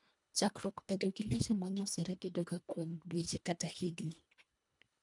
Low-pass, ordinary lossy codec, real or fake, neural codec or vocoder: 10.8 kHz; MP3, 96 kbps; fake; codec, 24 kHz, 1.5 kbps, HILCodec